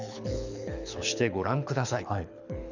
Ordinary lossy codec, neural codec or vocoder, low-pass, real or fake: none; codec, 24 kHz, 6 kbps, HILCodec; 7.2 kHz; fake